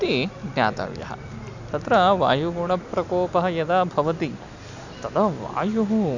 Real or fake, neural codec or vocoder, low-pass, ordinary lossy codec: real; none; 7.2 kHz; none